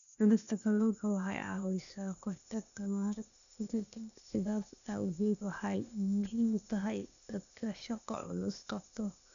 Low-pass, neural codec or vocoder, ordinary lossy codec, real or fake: 7.2 kHz; codec, 16 kHz, 0.8 kbps, ZipCodec; none; fake